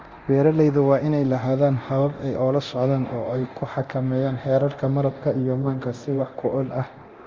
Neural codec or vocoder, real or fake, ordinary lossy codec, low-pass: codec, 24 kHz, 0.9 kbps, DualCodec; fake; Opus, 32 kbps; 7.2 kHz